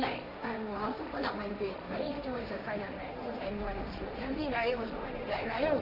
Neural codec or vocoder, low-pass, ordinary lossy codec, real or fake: codec, 16 kHz, 1.1 kbps, Voila-Tokenizer; 5.4 kHz; none; fake